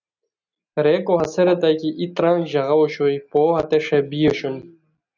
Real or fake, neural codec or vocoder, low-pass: real; none; 7.2 kHz